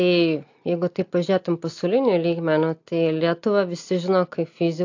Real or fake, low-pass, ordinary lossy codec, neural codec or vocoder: real; 7.2 kHz; AAC, 48 kbps; none